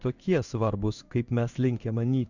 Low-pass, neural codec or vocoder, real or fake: 7.2 kHz; codec, 16 kHz in and 24 kHz out, 1 kbps, XY-Tokenizer; fake